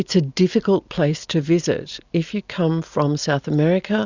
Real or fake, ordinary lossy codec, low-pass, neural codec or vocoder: real; Opus, 64 kbps; 7.2 kHz; none